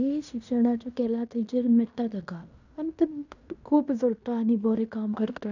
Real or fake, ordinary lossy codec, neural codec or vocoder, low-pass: fake; none; codec, 16 kHz in and 24 kHz out, 0.9 kbps, LongCat-Audio-Codec, fine tuned four codebook decoder; 7.2 kHz